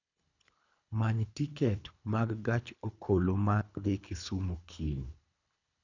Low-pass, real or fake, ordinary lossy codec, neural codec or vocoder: 7.2 kHz; fake; none; codec, 24 kHz, 3 kbps, HILCodec